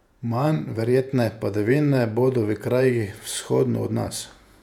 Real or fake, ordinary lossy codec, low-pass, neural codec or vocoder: fake; none; 19.8 kHz; vocoder, 48 kHz, 128 mel bands, Vocos